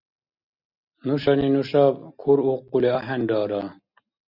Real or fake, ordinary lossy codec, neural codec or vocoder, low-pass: real; Opus, 64 kbps; none; 5.4 kHz